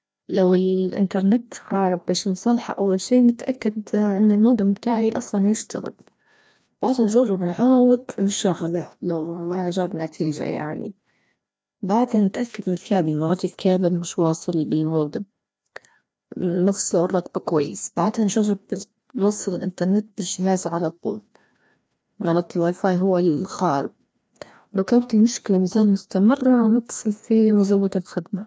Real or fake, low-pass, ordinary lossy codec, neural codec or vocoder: fake; none; none; codec, 16 kHz, 1 kbps, FreqCodec, larger model